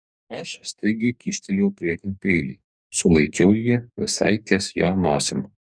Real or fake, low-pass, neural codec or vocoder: fake; 9.9 kHz; codec, 44.1 kHz, 2.6 kbps, DAC